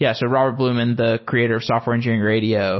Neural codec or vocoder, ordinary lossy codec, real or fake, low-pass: none; MP3, 24 kbps; real; 7.2 kHz